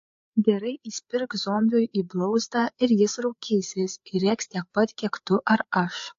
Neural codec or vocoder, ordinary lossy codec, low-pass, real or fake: codec, 16 kHz, 8 kbps, FreqCodec, larger model; AAC, 48 kbps; 7.2 kHz; fake